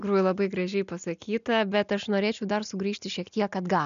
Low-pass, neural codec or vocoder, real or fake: 7.2 kHz; none; real